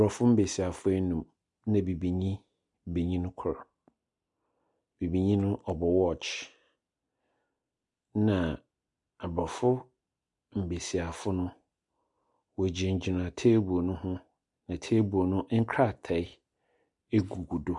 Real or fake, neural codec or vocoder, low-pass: real; none; 10.8 kHz